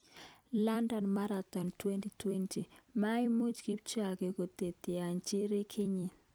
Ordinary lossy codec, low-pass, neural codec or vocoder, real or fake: none; none; vocoder, 44.1 kHz, 128 mel bands every 256 samples, BigVGAN v2; fake